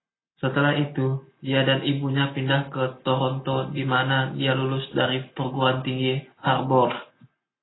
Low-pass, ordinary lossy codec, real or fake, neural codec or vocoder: 7.2 kHz; AAC, 16 kbps; real; none